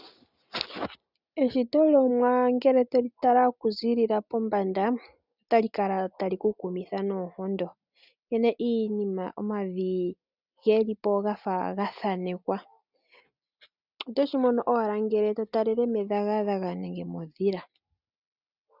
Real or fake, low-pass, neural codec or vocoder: real; 5.4 kHz; none